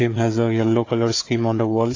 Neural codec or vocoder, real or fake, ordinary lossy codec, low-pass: codec, 16 kHz, 4 kbps, FunCodec, trained on Chinese and English, 50 frames a second; fake; AAC, 32 kbps; 7.2 kHz